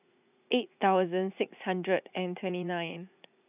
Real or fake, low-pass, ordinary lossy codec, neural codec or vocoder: fake; 3.6 kHz; none; vocoder, 44.1 kHz, 80 mel bands, Vocos